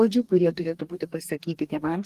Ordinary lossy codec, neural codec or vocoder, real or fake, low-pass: Opus, 24 kbps; codec, 44.1 kHz, 2.6 kbps, DAC; fake; 14.4 kHz